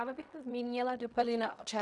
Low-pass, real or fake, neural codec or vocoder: 10.8 kHz; fake; codec, 16 kHz in and 24 kHz out, 0.4 kbps, LongCat-Audio-Codec, fine tuned four codebook decoder